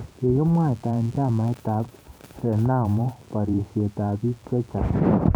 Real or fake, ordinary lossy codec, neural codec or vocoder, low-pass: fake; none; vocoder, 44.1 kHz, 128 mel bands every 512 samples, BigVGAN v2; none